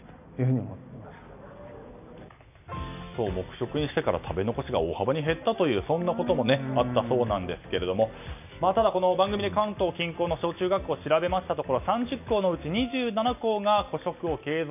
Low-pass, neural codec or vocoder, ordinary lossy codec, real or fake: 3.6 kHz; none; none; real